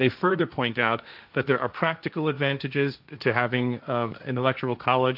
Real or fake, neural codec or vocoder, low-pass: fake; codec, 16 kHz, 1.1 kbps, Voila-Tokenizer; 5.4 kHz